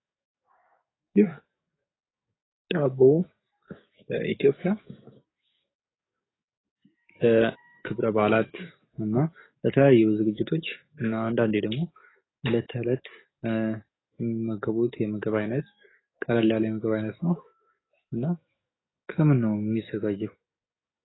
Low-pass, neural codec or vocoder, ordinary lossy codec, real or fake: 7.2 kHz; codec, 44.1 kHz, 7.8 kbps, DAC; AAC, 16 kbps; fake